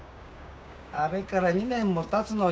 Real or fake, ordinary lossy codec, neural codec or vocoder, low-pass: fake; none; codec, 16 kHz, 6 kbps, DAC; none